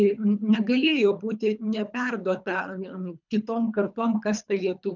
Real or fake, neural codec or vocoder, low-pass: fake; codec, 24 kHz, 3 kbps, HILCodec; 7.2 kHz